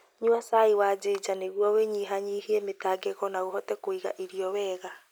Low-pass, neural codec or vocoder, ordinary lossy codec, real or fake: none; none; none; real